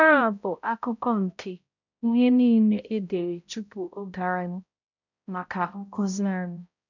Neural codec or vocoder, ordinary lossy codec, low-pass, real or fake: codec, 16 kHz, 0.5 kbps, X-Codec, HuBERT features, trained on balanced general audio; none; 7.2 kHz; fake